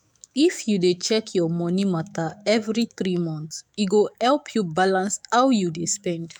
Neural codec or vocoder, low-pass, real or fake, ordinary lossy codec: autoencoder, 48 kHz, 128 numbers a frame, DAC-VAE, trained on Japanese speech; 19.8 kHz; fake; none